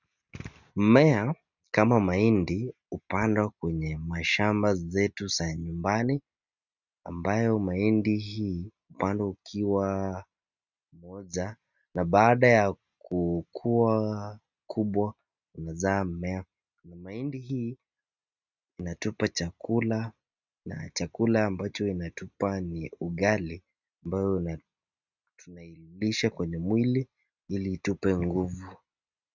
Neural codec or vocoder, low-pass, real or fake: none; 7.2 kHz; real